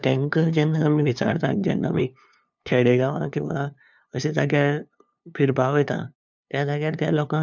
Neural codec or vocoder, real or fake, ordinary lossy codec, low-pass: codec, 16 kHz, 2 kbps, FunCodec, trained on LibriTTS, 25 frames a second; fake; none; 7.2 kHz